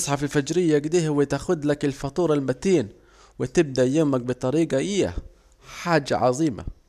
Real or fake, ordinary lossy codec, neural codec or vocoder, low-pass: real; none; none; 14.4 kHz